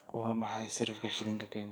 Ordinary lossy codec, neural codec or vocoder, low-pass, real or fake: none; codec, 44.1 kHz, 2.6 kbps, SNAC; none; fake